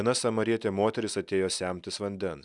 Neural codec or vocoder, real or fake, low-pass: none; real; 10.8 kHz